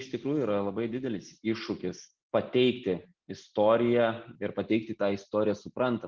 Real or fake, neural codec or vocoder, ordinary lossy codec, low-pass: real; none; Opus, 16 kbps; 7.2 kHz